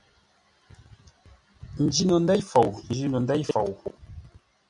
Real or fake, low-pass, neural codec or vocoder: real; 10.8 kHz; none